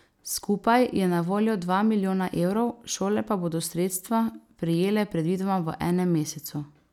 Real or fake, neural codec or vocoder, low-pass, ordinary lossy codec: real; none; 19.8 kHz; none